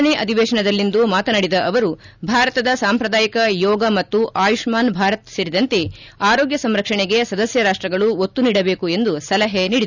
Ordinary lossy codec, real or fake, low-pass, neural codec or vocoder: none; real; 7.2 kHz; none